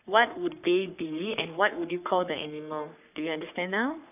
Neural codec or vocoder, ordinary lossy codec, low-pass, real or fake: codec, 44.1 kHz, 3.4 kbps, Pupu-Codec; none; 3.6 kHz; fake